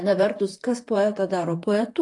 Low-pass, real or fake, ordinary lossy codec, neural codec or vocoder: 10.8 kHz; fake; AAC, 48 kbps; vocoder, 44.1 kHz, 128 mel bands, Pupu-Vocoder